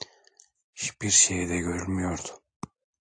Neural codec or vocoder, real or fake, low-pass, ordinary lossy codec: none; real; 9.9 kHz; AAC, 48 kbps